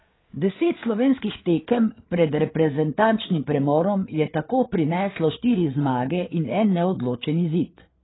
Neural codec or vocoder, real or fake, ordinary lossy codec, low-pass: codec, 16 kHz, 8 kbps, FreqCodec, larger model; fake; AAC, 16 kbps; 7.2 kHz